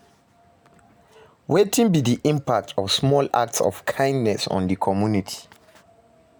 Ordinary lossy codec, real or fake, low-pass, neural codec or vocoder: none; real; none; none